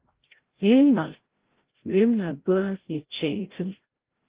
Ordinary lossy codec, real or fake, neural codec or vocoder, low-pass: Opus, 16 kbps; fake; codec, 16 kHz, 0.5 kbps, FreqCodec, larger model; 3.6 kHz